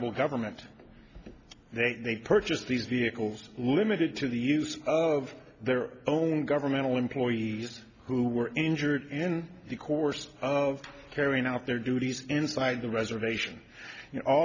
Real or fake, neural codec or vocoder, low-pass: real; none; 7.2 kHz